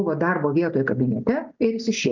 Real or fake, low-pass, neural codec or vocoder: real; 7.2 kHz; none